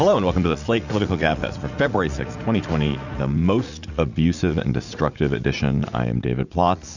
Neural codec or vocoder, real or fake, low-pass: vocoder, 44.1 kHz, 80 mel bands, Vocos; fake; 7.2 kHz